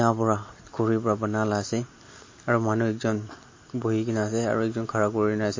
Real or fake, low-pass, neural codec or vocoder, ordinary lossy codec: real; 7.2 kHz; none; MP3, 32 kbps